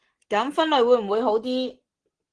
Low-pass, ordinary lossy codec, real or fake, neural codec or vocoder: 9.9 kHz; Opus, 16 kbps; real; none